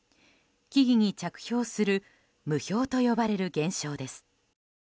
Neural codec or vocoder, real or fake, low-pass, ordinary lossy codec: none; real; none; none